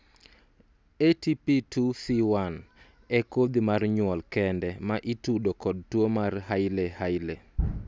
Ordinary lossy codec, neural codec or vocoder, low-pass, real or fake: none; none; none; real